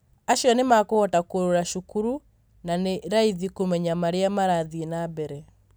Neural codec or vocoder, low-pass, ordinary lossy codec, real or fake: none; none; none; real